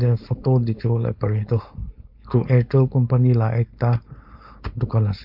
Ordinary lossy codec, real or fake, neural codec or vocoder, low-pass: MP3, 48 kbps; fake; codec, 16 kHz, 4.8 kbps, FACodec; 5.4 kHz